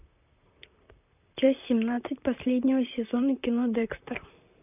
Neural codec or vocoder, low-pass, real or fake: vocoder, 44.1 kHz, 128 mel bands, Pupu-Vocoder; 3.6 kHz; fake